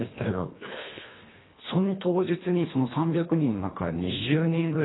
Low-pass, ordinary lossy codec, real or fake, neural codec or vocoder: 7.2 kHz; AAC, 16 kbps; fake; codec, 24 kHz, 1.5 kbps, HILCodec